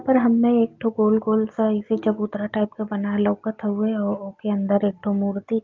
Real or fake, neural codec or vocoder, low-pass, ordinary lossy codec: real; none; 7.2 kHz; Opus, 24 kbps